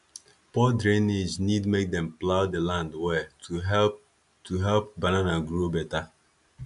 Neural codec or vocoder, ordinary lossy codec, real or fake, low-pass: none; none; real; 10.8 kHz